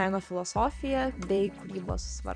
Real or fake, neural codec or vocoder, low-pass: fake; vocoder, 44.1 kHz, 128 mel bands every 256 samples, BigVGAN v2; 9.9 kHz